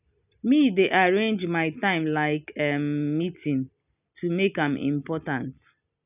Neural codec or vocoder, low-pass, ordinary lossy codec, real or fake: none; 3.6 kHz; none; real